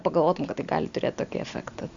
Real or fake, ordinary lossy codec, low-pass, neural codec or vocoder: real; Opus, 64 kbps; 7.2 kHz; none